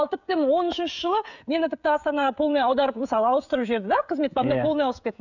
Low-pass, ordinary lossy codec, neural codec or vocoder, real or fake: 7.2 kHz; none; codec, 16 kHz, 16 kbps, FreqCodec, smaller model; fake